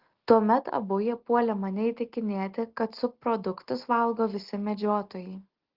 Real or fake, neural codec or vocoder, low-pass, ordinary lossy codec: real; none; 5.4 kHz; Opus, 16 kbps